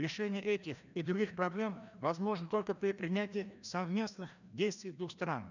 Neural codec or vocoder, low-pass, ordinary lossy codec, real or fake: codec, 16 kHz, 1 kbps, FreqCodec, larger model; 7.2 kHz; none; fake